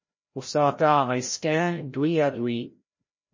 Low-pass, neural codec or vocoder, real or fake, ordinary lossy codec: 7.2 kHz; codec, 16 kHz, 0.5 kbps, FreqCodec, larger model; fake; MP3, 32 kbps